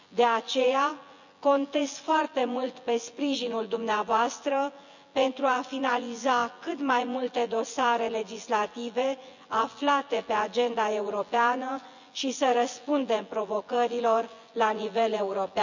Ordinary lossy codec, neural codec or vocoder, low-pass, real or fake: none; vocoder, 24 kHz, 100 mel bands, Vocos; 7.2 kHz; fake